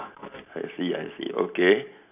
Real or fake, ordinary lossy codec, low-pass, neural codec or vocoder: real; none; 3.6 kHz; none